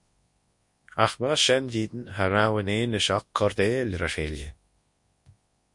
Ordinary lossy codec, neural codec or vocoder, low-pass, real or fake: MP3, 48 kbps; codec, 24 kHz, 0.9 kbps, WavTokenizer, large speech release; 10.8 kHz; fake